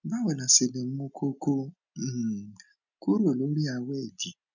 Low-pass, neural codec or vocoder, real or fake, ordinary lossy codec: 7.2 kHz; none; real; none